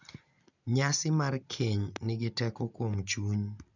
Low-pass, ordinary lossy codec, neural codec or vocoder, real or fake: 7.2 kHz; none; none; real